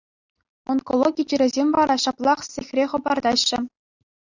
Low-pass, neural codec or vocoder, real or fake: 7.2 kHz; none; real